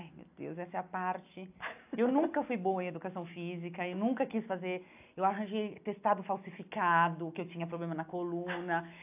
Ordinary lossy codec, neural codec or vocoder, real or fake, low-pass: AAC, 32 kbps; none; real; 3.6 kHz